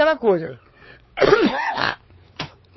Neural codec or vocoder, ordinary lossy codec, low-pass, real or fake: codec, 16 kHz, 4 kbps, X-Codec, WavLM features, trained on Multilingual LibriSpeech; MP3, 24 kbps; 7.2 kHz; fake